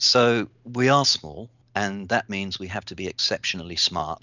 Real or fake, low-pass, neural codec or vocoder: real; 7.2 kHz; none